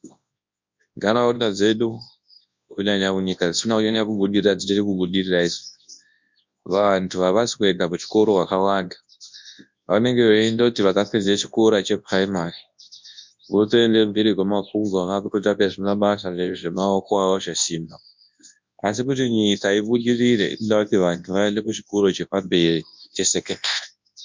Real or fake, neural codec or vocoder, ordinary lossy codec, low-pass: fake; codec, 24 kHz, 0.9 kbps, WavTokenizer, large speech release; MP3, 64 kbps; 7.2 kHz